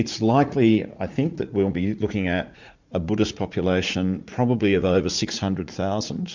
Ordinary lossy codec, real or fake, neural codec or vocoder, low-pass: MP3, 64 kbps; fake; vocoder, 44.1 kHz, 80 mel bands, Vocos; 7.2 kHz